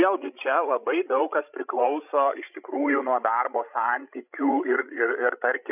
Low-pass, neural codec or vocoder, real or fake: 3.6 kHz; codec, 16 kHz, 16 kbps, FreqCodec, larger model; fake